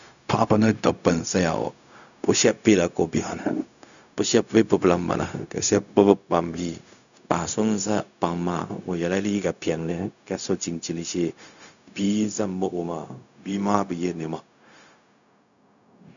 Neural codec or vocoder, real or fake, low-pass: codec, 16 kHz, 0.4 kbps, LongCat-Audio-Codec; fake; 7.2 kHz